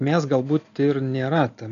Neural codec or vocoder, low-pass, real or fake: none; 7.2 kHz; real